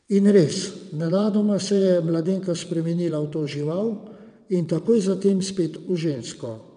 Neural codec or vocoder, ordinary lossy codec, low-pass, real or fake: vocoder, 22.05 kHz, 80 mel bands, WaveNeXt; none; 9.9 kHz; fake